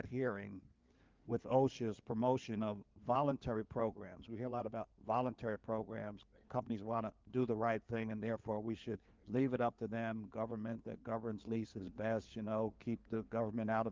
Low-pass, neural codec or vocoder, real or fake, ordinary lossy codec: 7.2 kHz; codec, 16 kHz in and 24 kHz out, 2.2 kbps, FireRedTTS-2 codec; fake; Opus, 32 kbps